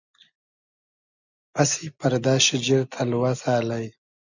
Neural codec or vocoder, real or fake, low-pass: none; real; 7.2 kHz